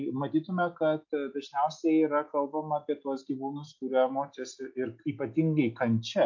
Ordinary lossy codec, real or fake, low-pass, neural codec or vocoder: MP3, 48 kbps; real; 7.2 kHz; none